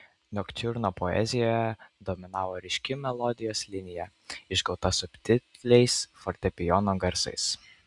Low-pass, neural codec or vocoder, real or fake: 10.8 kHz; none; real